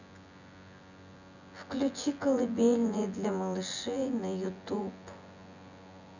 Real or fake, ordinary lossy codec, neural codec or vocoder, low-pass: fake; none; vocoder, 24 kHz, 100 mel bands, Vocos; 7.2 kHz